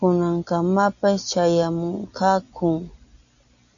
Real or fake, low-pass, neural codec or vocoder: real; 7.2 kHz; none